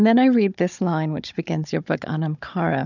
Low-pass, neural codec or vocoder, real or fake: 7.2 kHz; codec, 16 kHz, 16 kbps, FunCodec, trained on Chinese and English, 50 frames a second; fake